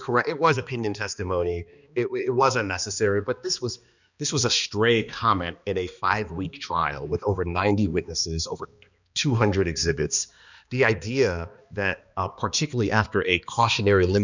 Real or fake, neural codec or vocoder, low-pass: fake; codec, 16 kHz, 2 kbps, X-Codec, HuBERT features, trained on balanced general audio; 7.2 kHz